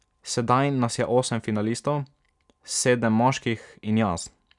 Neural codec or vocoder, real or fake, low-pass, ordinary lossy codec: none; real; 10.8 kHz; none